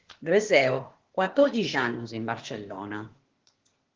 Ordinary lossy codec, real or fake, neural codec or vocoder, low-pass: Opus, 16 kbps; fake; codec, 16 kHz, 0.8 kbps, ZipCodec; 7.2 kHz